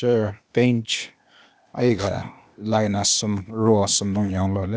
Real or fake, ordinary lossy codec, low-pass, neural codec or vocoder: fake; none; none; codec, 16 kHz, 0.8 kbps, ZipCodec